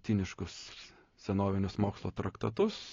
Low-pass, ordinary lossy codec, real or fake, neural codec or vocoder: 7.2 kHz; AAC, 32 kbps; real; none